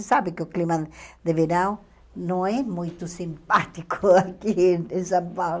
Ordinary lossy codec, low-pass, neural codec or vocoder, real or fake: none; none; none; real